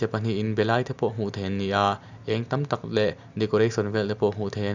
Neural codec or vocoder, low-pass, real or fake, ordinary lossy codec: none; 7.2 kHz; real; none